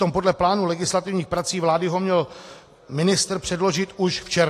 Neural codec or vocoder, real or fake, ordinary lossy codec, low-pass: none; real; AAC, 48 kbps; 14.4 kHz